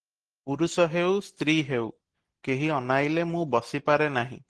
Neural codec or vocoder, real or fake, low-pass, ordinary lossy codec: none; real; 10.8 kHz; Opus, 16 kbps